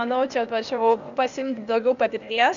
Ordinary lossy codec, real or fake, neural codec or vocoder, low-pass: MP3, 96 kbps; fake; codec, 16 kHz, 0.8 kbps, ZipCodec; 7.2 kHz